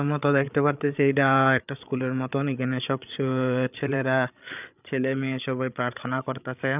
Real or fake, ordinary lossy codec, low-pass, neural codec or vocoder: fake; none; 3.6 kHz; codec, 16 kHz, 8 kbps, FreqCodec, larger model